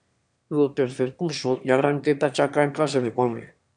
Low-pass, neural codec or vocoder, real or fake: 9.9 kHz; autoencoder, 22.05 kHz, a latent of 192 numbers a frame, VITS, trained on one speaker; fake